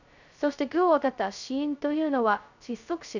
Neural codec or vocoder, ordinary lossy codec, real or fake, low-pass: codec, 16 kHz, 0.2 kbps, FocalCodec; none; fake; 7.2 kHz